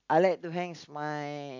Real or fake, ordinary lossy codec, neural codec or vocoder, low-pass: real; none; none; 7.2 kHz